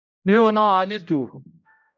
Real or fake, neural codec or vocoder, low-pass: fake; codec, 16 kHz, 0.5 kbps, X-Codec, HuBERT features, trained on general audio; 7.2 kHz